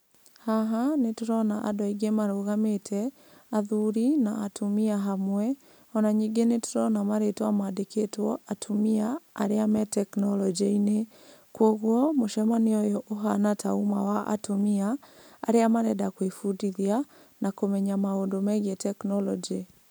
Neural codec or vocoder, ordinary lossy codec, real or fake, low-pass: none; none; real; none